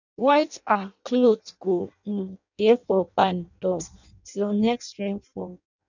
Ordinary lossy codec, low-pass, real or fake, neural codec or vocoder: none; 7.2 kHz; fake; codec, 16 kHz in and 24 kHz out, 0.6 kbps, FireRedTTS-2 codec